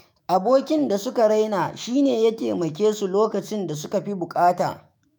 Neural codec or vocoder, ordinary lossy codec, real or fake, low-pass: autoencoder, 48 kHz, 128 numbers a frame, DAC-VAE, trained on Japanese speech; none; fake; none